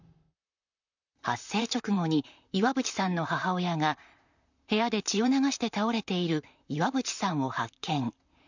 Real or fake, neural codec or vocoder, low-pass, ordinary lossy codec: fake; vocoder, 44.1 kHz, 128 mel bands, Pupu-Vocoder; 7.2 kHz; none